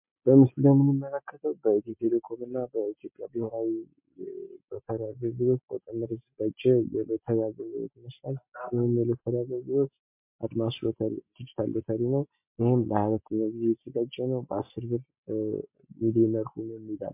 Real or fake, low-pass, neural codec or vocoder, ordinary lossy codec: real; 3.6 kHz; none; MP3, 32 kbps